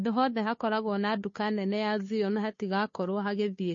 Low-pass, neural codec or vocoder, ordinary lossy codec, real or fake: 7.2 kHz; codec, 16 kHz, 2 kbps, FunCodec, trained on Chinese and English, 25 frames a second; MP3, 32 kbps; fake